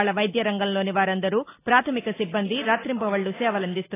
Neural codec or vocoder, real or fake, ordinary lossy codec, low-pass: none; real; AAC, 16 kbps; 3.6 kHz